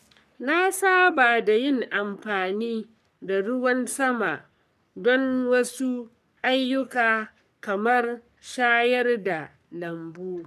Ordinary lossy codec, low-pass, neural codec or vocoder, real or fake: none; 14.4 kHz; codec, 44.1 kHz, 3.4 kbps, Pupu-Codec; fake